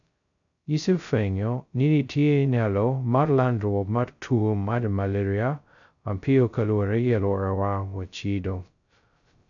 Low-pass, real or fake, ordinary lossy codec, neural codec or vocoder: 7.2 kHz; fake; none; codec, 16 kHz, 0.2 kbps, FocalCodec